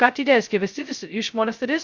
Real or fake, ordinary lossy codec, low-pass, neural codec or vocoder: fake; Opus, 64 kbps; 7.2 kHz; codec, 16 kHz, 0.2 kbps, FocalCodec